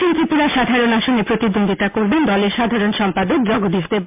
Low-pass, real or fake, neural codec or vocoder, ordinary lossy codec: 3.6 kHz; real; none; MP3, 32 kbps